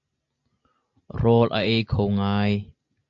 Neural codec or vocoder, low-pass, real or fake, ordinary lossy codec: none; 7.2 kHz; real; AAC, 64 kbps